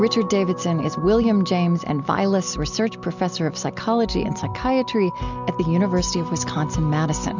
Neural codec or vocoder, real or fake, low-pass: none; real; 7.2 kHz